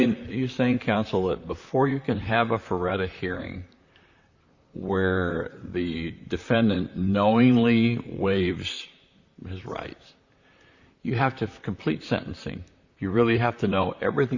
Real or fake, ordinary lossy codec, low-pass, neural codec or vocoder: fake; Opus, 64 kbps; 7.2 kHz; vocoder, 44.1 kHz, 128 mel bands, Pupu-Vocoder